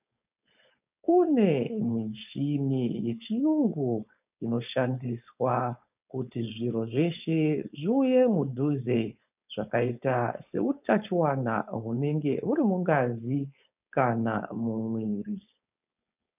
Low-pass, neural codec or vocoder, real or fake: 3.6 kHz; codec, 16 kHz, 4.8 kbps, FACodec; fake